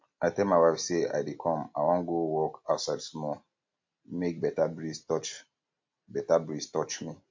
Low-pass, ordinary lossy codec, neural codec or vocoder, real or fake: 7.2 kHz; MP3, 48 kbps; none; real